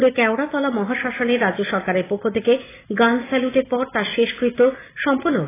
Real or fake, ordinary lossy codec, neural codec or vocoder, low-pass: real; AAC, 16 kbps; none; 3.6 kHz